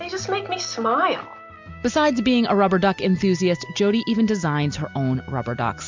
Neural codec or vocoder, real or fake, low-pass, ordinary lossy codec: none; real; 7.2 kHz; MP3, 64 kbps